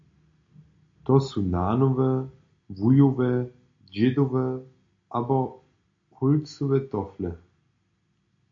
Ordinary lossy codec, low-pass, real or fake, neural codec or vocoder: AAC, 48 kbps; 7.2 kHz; real; none